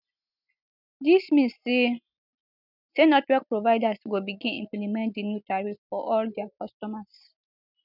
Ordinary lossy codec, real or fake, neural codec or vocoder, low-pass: none; real; none; 5.4 kHz